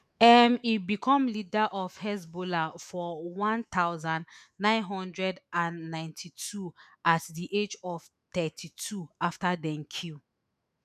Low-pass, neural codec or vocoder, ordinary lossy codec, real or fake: 14.4 kHz; autoencoder, 48 kHz, 128 numbers a frame, DAC-VAE, trained on Japanese speech; none; fake